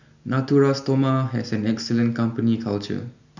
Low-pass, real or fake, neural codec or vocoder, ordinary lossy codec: 7.2 kHz; real; none; none